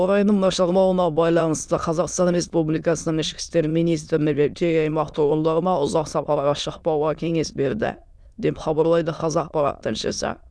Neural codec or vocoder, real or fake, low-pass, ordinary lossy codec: autoencoder, 22.05 kHz, a latent of 192 numbers a frame, VITS, trained on many speakers; fake; none; none